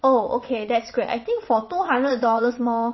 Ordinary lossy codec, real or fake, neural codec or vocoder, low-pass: MP3, 24 kbps; fake; vocoder, 22.05 kHz, 80 mel bands, WaveNeXt; 7.2 kHz